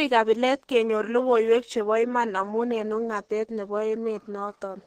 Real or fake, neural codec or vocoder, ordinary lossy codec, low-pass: fake; codec, 32 kHz, 1.9 kbps, SNAC; Opus, 16 kbps; 14.4 kHz